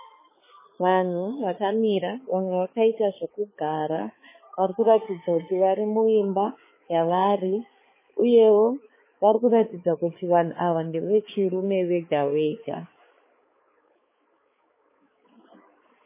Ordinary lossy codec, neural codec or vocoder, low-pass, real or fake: MP3, 16 kbps; codec, 16 kHz, 4 kbps, X-Codec, HuBERT features, trained on balanced general audio; 3.6 kHz; fake